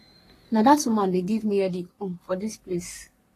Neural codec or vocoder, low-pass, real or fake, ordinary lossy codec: codec, 44.1 kHz, 3.4 kbps, Pupu-Codec; 14.4 kHz; fake; AAC, 48 kbps